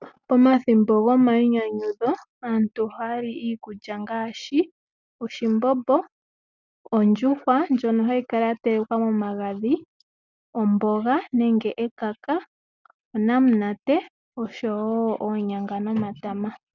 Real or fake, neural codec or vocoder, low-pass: real; none; 7.2 kHz